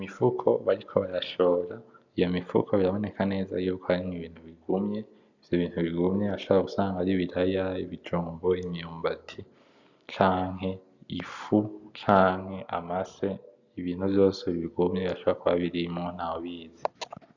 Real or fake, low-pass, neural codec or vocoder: real; 7.2 kHz; none